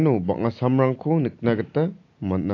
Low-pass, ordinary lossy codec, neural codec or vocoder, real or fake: 7.2 kHz; AAC, 48 kbps; none; real